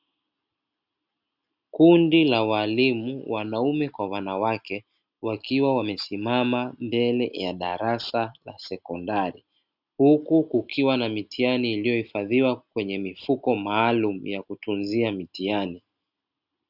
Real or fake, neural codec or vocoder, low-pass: real; none; 5.4 kHz